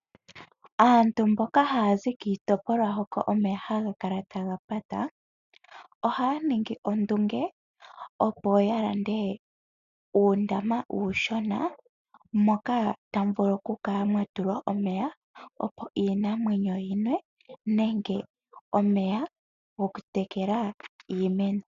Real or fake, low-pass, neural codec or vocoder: real; 7.2 kHz; none